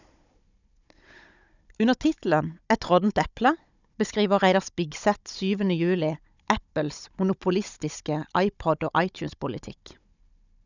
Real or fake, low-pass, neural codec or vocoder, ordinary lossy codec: fake; 7.2 kHz; codec, 16 kHz, 16 kbps, FunCodec, trained on Chinese and English, 50 frames a second; none